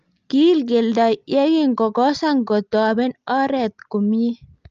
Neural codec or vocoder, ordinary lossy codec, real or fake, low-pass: none; Opus, 24 kbps; real; 7.2 kHz